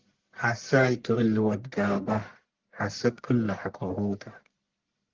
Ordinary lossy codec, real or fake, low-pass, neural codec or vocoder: Opus, 16 kbps; fake; 7.2 kHz; codec, 44.1 kHz, 1.7 kbps, Pupu-Codec